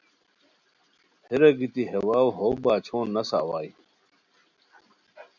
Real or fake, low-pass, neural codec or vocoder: real; 7.2 kHz; none